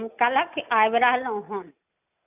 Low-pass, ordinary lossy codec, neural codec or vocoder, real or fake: 3.6 kHz; none; none; real